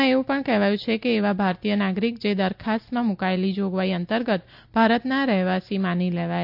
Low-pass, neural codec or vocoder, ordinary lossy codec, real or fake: 5.4 kHz; none; AAC, 48 kbps; real